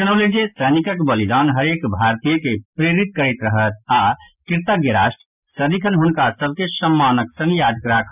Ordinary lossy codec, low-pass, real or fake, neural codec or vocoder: none; 3.6 kHz; real; none